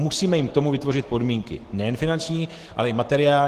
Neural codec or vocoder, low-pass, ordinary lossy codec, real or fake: autoencoder, 48 kHz, 128 numbers a frame, DAC-VAE, trained on Japanese speech; 14.4 kHz; Opus, 16 kbps; fake